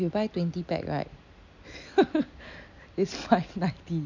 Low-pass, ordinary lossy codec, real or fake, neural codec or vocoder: 7.2 kHz; none; real; none